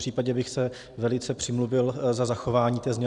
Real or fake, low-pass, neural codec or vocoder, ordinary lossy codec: fake; 10.8 kHz; vocoder, 44.1 kHz, 128 mel bands every 256 samples, BigVGAN v2; Opus, 64 kbps